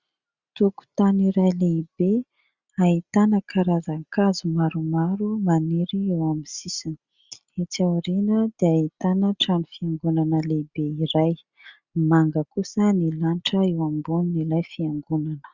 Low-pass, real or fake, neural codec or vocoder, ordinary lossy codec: 7.2 kHz; real; none; Opus, 64 kbps